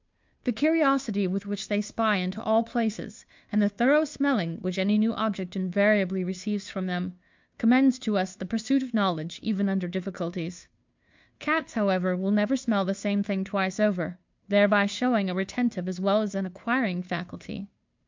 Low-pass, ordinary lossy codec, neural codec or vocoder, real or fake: 7.2 kHz; MP3, 64 kbps; codec, 16 kHz, 2 kbps, FunCodec, trained on Chinese and English, 25 frames a second; fake